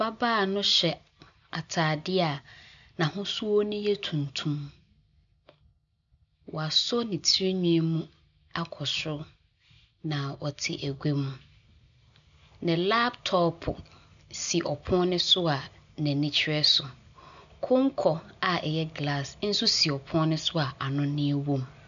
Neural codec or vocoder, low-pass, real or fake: none; 7.2 kHz; real